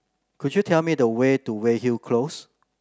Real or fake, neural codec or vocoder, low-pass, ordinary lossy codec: real; none; none; none